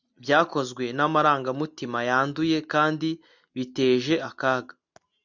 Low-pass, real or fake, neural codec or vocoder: 7.2 kHz; real; none